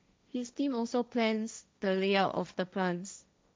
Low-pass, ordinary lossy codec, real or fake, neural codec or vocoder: none; none; fake; codec, 16 kHz, 1.1 kbps, Voila-Tokenizer